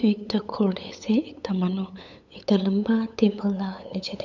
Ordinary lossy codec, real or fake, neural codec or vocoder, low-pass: none; fake; codec, 16 kHz, 8 kbps, FunCodec, trained on LibriTTS, 25 frames a second; 7.2 kHz